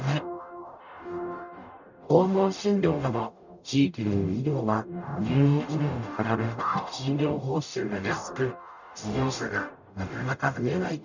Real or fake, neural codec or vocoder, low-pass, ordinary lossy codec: fake; codec, 44.1 kHz, 0.9 kbps, DAC; 7.2 kHz; none